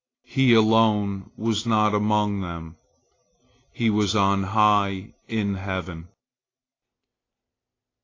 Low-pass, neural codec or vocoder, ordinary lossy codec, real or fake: 7.2 kHz; none; AAC, 32 kbps; real